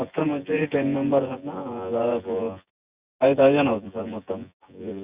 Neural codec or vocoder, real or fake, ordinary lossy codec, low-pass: vocoder, 24 kHz, 100 mel bands, Vocos; fake; Opus, 64 kbps; 3.6 kHz